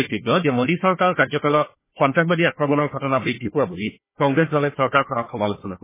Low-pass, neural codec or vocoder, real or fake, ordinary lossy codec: 3.6 kHz; codec, 16 kHz, 1 kbps, FunCodec, trained on Chinese and English, 50 frames a second; fake; MP3, 16 kbps